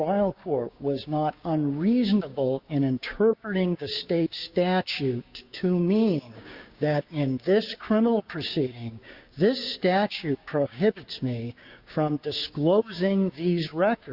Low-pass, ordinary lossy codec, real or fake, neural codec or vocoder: 5.4 kHz; Opus, 64 kbps; fake; codec, 16 kHz, 6 kbps, DAC